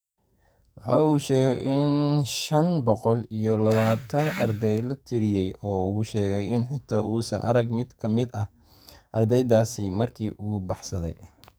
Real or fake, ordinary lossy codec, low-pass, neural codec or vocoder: fake; none; none; codec, 44.1 kHz, 2.6 kbps, SNAC